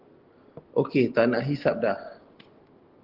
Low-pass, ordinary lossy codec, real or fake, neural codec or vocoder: 5.4 kHz; Opus, 16 kbps; real; none